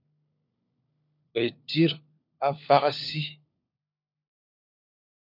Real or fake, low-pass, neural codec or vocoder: fake; 5.4 kHz; codec, 16 kHz, 4 kbps, FunCodec, trained on LibriTTS, 50 frames a second